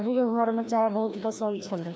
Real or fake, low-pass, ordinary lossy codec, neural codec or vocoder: fake; none; none; codec, 16 kHz, 1 kbps, FreqCodec, larger model